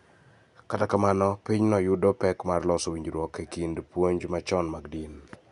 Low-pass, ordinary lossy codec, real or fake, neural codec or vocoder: 10.8 kHz; none; real; none